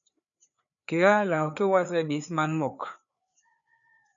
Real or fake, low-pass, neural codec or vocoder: fake; 7.2 kHz; codec, 16 kHz, 4 kbps, FreqCodec, larger model